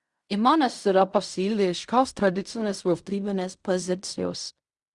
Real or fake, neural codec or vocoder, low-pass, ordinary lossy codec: fake; codec, 16 kHz in and 24 kHz out, 0.4 kbps, LongCat-Audio-Codec, fine tuned four codebook decoder; 10.8 kHz; Opus, 64 kbps